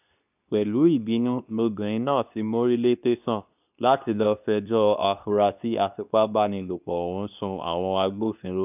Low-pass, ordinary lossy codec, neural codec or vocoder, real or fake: 3.6 kHz; none; codec, 24 kHz, 0.9 kbps, WavTokenizer, medium speech release version 2; fake